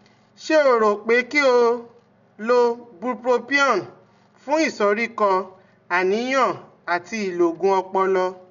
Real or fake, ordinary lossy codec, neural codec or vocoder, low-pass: real; none; none; 7.2 kHz